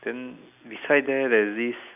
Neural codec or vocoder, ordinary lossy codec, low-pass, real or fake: none; none; 3.6 kHz; real